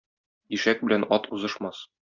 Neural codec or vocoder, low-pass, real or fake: none; 7.2 kHz; real